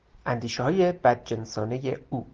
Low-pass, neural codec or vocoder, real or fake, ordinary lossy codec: 7.2 kHz; none; real; Opus, 16 kbps